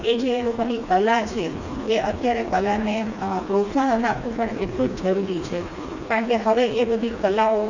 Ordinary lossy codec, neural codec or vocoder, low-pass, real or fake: none; codec, 16 kHz, 2 kbps, FreqCodec, smaller model; 7.2 kHz; fake